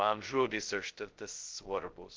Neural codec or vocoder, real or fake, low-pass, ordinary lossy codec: codec, 16 kHz, 0.2 kbps, FocalCodec; fake; 7.2 kHz; Opus, 16 kbps